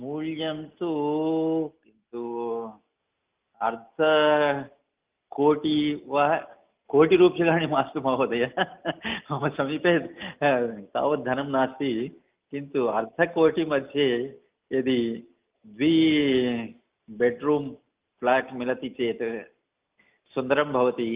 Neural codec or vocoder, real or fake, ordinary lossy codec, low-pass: none; real; Opus, 32 kbps; 3.6 kHz